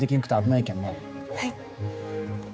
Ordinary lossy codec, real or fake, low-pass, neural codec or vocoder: none; fake; none; codec, 16 kHz, 4 kbps, X-Codec, HuBERT features, trained on general audio